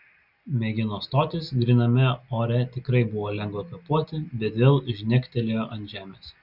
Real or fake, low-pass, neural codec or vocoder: real; 5.4 kHz; none